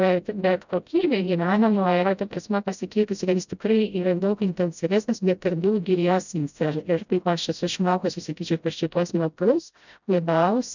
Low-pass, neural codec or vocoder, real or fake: 7.2 kHz; codec, 16 kHz, 0.5 kbps, FreqCodec, smaller model; fake